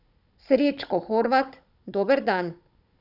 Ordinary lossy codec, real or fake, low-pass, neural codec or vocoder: none; fake; 5.4 kHz; codec, 16 kHz, 16 kbps, FunCodec, trained on Chinese and English, 50 frames a second